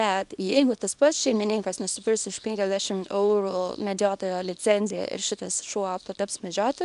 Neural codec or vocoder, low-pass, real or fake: codec, 24 kHz, 0.9 kbps, WavTokenizer, small release; 10.8 kHz; fake